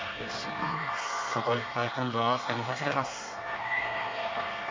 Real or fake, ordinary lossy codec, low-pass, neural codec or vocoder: fake; MP3, 48 kbps; 7.2 kHz; codec, 24 kHz, 1 kbps, SNAC